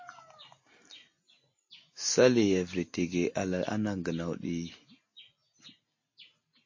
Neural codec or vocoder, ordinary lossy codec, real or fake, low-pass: none; MP3, 32 kbps; real; 7.2 kHz